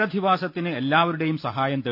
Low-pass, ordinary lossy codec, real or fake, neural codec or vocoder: 5.4 kHz; none; real; none